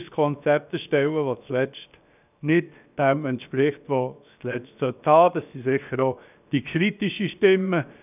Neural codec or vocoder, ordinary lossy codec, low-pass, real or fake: codec, 16 kHz, 0.7 kbps, FocalCodec; none; 3.6 kHz; fake